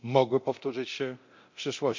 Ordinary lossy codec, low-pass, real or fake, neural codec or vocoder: MP3, 48 kbps; 7.2 kHz; fake; codec, 24 kHz, 0.9 kbps, DualCodec